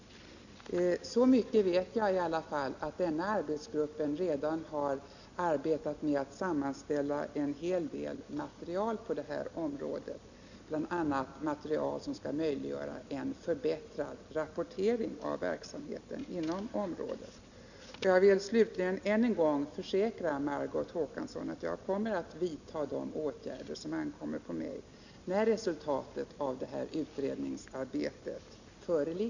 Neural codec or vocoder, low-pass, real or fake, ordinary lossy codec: none; 7.2 kHz; real; none